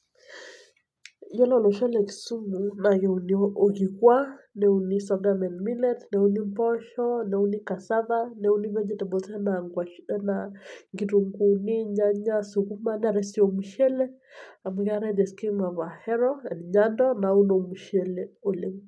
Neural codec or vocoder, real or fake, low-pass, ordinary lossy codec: none; real; none; none